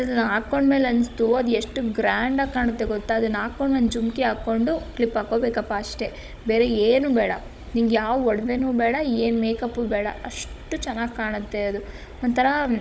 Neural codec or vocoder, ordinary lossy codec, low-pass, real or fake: codec, 16 kHz, 16 kbps, FunCodec, trained on Chinese and English, 50 frames a second; none; none; fake